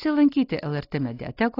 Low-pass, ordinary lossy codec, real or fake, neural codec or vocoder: 5.4 kHz; AAC, 24 kbps; real; none